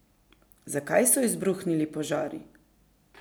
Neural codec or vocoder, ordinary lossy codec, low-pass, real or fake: none; none; none; real